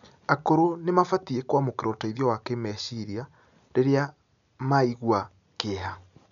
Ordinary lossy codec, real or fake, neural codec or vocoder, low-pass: none; real; none; 7.2 kHz